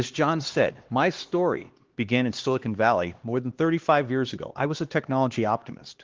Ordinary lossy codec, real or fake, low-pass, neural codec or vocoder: Opus, 16 kbps; fake; 7.2 kHz; codec, 16 kHz, 2 kbps, X-Codec, HuBERT features, trained on LibriSpeech